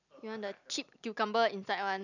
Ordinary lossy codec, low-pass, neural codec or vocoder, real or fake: none; 7.2 kHz; none; real